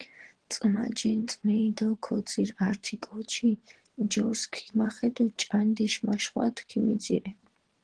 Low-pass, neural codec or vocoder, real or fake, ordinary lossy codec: 9.9 kHz; vocoder, 22.05 kHz, 80 mel bands, WaveNeXt; fake; Opus, 16 kbps